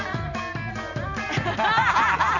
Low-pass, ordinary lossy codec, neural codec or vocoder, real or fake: 7.2 kHz; none; none; real